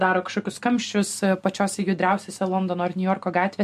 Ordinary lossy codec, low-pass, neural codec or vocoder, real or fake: MP3, 64 kbps; 14.4 kHz; none; real